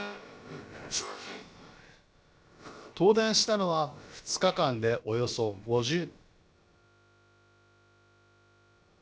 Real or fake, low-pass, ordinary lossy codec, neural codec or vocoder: fake; none; none; codec, 16 kHz, about 1 kbps, DyCAST, with the encoder's durations